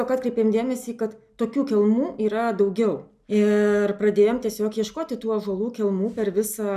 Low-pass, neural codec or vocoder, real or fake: 14.4 kHz; none; real